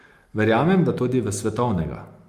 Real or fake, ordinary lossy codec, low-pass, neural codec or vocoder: real; Opus, 32 kbps; 14.4 kHz; none